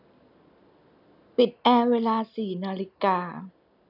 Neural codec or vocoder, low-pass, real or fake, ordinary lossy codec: none; 5.4 kHz; real; none